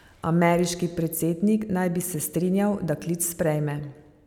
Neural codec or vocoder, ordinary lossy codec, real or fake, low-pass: none; none; real; 19.8 kHz